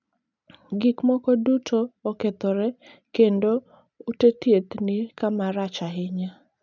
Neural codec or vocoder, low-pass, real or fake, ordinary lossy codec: none; 7.2 kHz; real; none